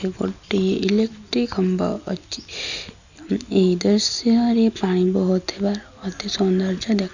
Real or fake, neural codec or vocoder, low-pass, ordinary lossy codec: real; none; 7.2 kHz; none